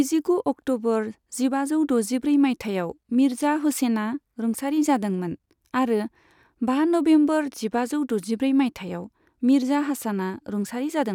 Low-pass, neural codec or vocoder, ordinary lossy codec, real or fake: 19.8 kHz; none; none; real